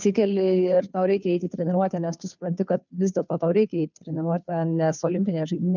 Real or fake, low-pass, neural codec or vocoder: fake; 7.2 kHz; codec, 16 kHz, 2 kbps, FunCodec, trained on Chinese and English, 25 frames a second